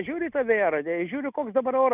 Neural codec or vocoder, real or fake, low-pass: none; real; 3.6 kHz